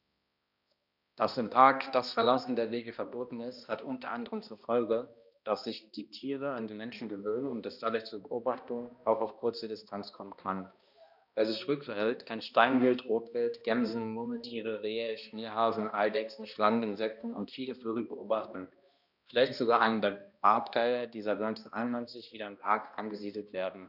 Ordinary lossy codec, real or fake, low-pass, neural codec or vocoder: none; fake; 5.4 kHz; codec, 16 kHz, 1 kbps, X-Codec, HuBERT features, trained on balanced general audio